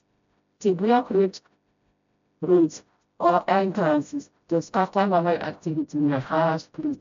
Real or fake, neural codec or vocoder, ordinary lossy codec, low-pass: fake; codec, 16 kHz, 0.5 kbps, FreqCodec, smaller model; MP3, 64 kbps; 7.2 kHz